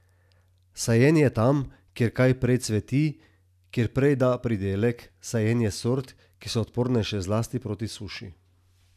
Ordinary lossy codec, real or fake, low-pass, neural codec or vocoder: none; real; 14.4 kHz; none